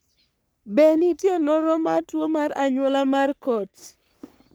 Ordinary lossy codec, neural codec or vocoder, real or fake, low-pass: none; codec, 44.1 kHz, 3.4 kbps, Pupu-Codec; fake; none